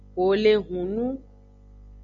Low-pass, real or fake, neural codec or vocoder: 7.2 kHz; real; none